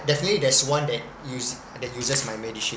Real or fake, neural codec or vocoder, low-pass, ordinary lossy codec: real; none; none; none